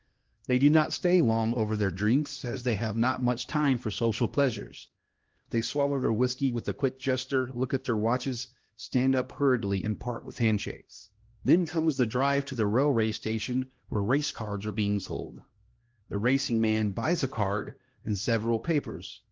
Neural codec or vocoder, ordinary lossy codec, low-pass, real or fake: codec, 16 kHz, 1 kbps, X-Codec, HuBERT features, trained on LibriSpeech; Opus, 16 kbps; 7.2 kHz; fake